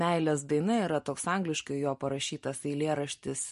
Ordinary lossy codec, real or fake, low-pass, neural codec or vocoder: MP3, 48 kbps; real; 14.4 kHz; none